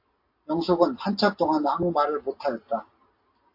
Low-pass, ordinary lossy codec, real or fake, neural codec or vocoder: 5.4 kHz; MP3, 32 kbps; real; none